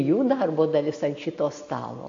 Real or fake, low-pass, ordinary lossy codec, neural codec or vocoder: real; 7.2 kHz; AAC, 48 kbps; none